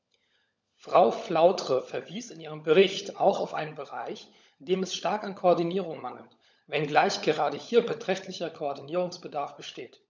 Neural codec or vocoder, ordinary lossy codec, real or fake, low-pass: codec, 16 kHz, 16 kbps, FunCodec, trained on LibriTTS, 50 frames a second; none; fake; none